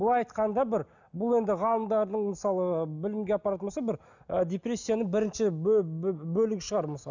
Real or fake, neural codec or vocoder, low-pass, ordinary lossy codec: real; none; 7.2 kHz; none